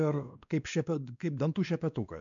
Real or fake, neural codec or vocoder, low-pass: fake; codec, 16 kHz, 2 kbps, X-Codec, WavLM features, trained on Multilingual LibriSpeech; 7.2 kHz